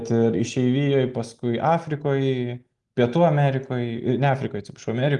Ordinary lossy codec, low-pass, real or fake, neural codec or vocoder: Opus, 32 kbps; 10.8 kHz; real; none